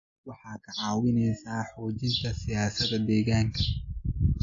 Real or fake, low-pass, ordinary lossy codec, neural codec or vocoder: real; 7.2 kHz; AAC, 48 kbps; none